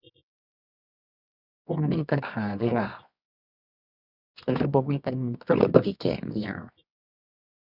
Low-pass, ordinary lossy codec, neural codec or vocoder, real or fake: 5.4 kHz; none; codec, 24 kHz, 0.9 kbps, WavTokenizer, medium music audio release; fake